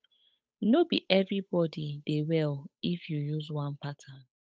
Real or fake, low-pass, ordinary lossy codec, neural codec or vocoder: fake; none; none; codec, 16 kHz, 8 kbps, FunCodec, trained on Chinese and English, 25 frames a second